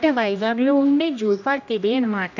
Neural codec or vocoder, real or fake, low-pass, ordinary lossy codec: codec, 16 kHz, 1 kbps, X-Codec, HuBERT features, trained on general audio; fake; 7.2 kHz; none